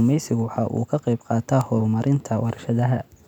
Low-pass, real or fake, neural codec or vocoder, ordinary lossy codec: 19.8 kHz; real; none; none